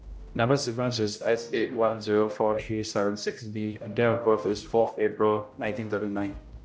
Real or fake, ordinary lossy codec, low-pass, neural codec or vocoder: fake; none; none; codec, 16 kHz, 0.5 kbps, X-Codec, HuBERT features, trained on general audio